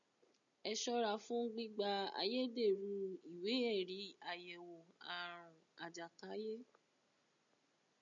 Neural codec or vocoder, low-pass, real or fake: none; 7.2 kHz; real